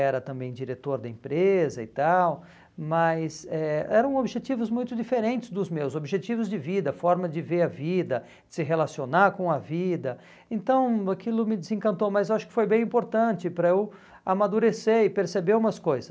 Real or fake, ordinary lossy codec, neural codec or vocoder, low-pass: real; none; none; none